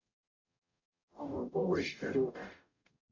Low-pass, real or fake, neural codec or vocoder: 7.2 kHz; fake; codec, 44.1 kHz, 0.9 kbps, DAC